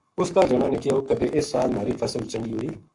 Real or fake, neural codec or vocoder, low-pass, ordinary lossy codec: fake; codec, 44.1 kHz, 7.8 kbps, Pupu-Codec; 10.8 kHz; AAC, 64 kbps